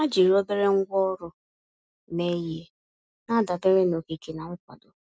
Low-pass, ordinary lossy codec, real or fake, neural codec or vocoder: none; none; real; none